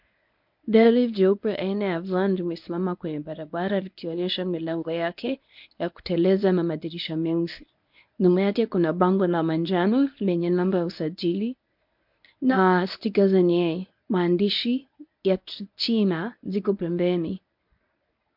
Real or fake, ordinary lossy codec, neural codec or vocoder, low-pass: fake; MP3, 48 kbps; codec, 24 kHz, 0.9 kbps, WavTokenizer, medium speech release version 1; 5.4 kHz